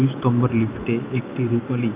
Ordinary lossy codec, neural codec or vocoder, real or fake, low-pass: Opus, 24 kbps; none; real; 3.6 kHz